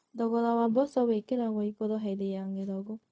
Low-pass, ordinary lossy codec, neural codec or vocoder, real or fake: none; none; codec, 16 kHz, 0.4 kbps, LongCat-Audio-Codec; fake